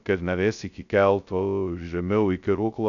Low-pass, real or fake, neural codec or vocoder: 7.2 kHz; fake; codec, 16 kHz, 0.2 kbps, FocalCodec